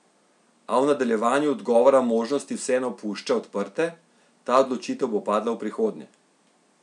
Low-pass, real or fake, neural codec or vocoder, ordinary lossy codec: 10.8 kHz; real; none; none